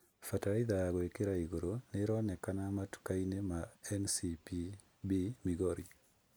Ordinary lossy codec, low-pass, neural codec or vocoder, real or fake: none; none; none; real